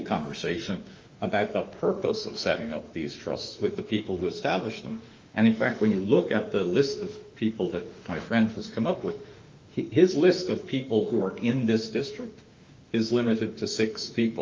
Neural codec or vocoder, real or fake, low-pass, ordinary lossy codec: autoencoder, 48 kHz, 32 numbers a frame, DAC-VAE, trained on Japanese speech; fake; 7.2 kHz; Opus, 24 kbps